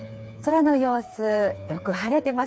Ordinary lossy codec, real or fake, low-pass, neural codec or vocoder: none; fake; none; codec, 16 kHz, 4 kbps, FreqCodec, smaller model